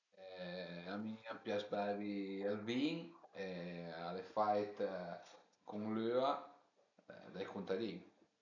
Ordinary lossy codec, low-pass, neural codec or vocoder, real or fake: none; 7.2 kHz; none; real